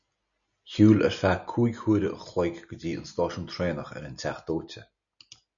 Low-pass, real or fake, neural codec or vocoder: 7.2 kHz; real; none